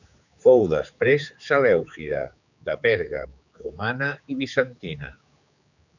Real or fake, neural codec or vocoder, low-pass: fake; codec, 16 kHz, 4 kbps, X-Codec, HuBERT features, trained on general audio; 7.2 kHz